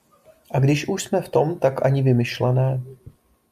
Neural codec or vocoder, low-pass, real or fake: none; 14.4 kHz; real